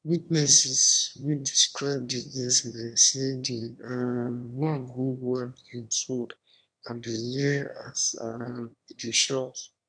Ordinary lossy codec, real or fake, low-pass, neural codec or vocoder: none; fake; 9.9 kHz; autoencoder, 22.05 kHz, a latent of 192 numbers a frame, VITS, trained on one speaker